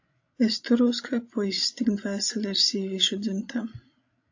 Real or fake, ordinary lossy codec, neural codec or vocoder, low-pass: fake; AAC, 48 kbps; codec, 16 kHz, 16 kbps, FreqCodec, larger model; 7.2 kHz